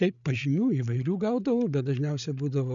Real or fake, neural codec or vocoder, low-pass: fake; codec, 16 kHz, 8 kbps, FreqCodec, larger model; 7.2 kHz